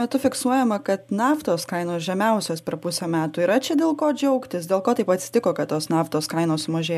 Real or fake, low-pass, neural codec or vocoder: real; 14.4 kHz; none